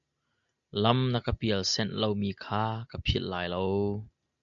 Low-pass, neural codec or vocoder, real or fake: 7.2 kHz; none; real